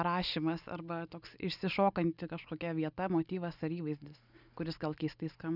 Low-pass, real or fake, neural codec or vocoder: 5.4 kHz; real; none